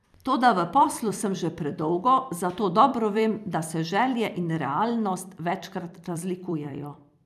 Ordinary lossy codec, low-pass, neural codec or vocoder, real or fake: none; 14.4 kHz; vocoder, 44.1 kHz, 128 mel bands every 256 samples, BigVGAN v2; fake